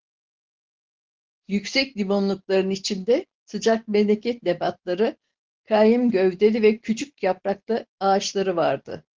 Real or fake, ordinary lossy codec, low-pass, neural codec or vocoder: real; Opus, 16 kbps; 7.2 kHz; none